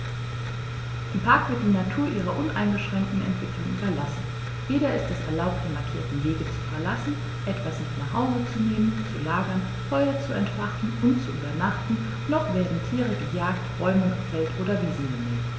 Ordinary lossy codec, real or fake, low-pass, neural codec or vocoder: none; real; none; none